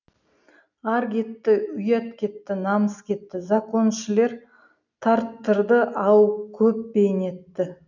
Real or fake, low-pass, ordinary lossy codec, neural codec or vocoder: real; 7.2 kHz; none; none